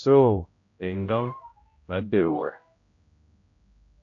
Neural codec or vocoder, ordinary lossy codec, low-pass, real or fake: codec, 16 kHz, 0.5 kbps, X-Codec, HuBERT features, trained on general audio; AAC, 48 kbps; 7.2 kHz; fake